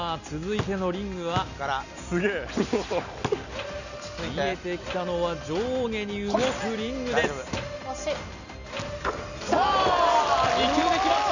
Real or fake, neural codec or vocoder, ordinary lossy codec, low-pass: real; none; none; 7.2 kHz